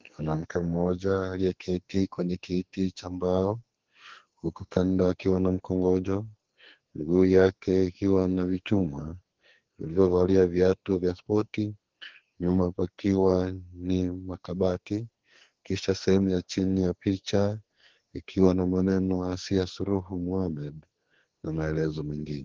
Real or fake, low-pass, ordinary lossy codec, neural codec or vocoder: fake; 7.2 kHz; Opus, 16 kbps; codec, 44.1 kHz, 2.6 kbps, SNAC